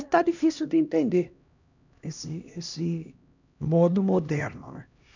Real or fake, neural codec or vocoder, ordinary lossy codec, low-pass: fake; codec, 16 kHz, 1 kbps, X-Codec, HuBERT features, trained on LibriSpeech; none; 7.2 kHz